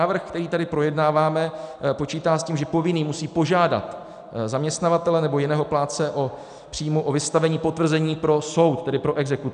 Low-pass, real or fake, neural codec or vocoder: 9.9 kHz; real; none